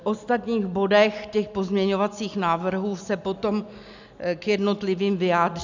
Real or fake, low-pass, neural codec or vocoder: real; 7.2 kHz; none